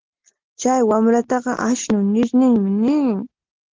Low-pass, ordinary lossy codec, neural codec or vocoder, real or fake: 7.2 kHz; Opus, 16 kbps; none; real